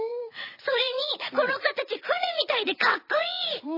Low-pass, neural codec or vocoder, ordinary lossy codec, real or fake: 5.4 kHz; vocoder, 22.05 kHz, 80 mel bands, WaveNeXt; MP3, 24 kbps; fake